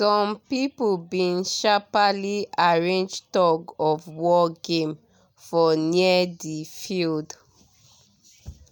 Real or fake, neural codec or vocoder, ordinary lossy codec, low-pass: real; none; none; none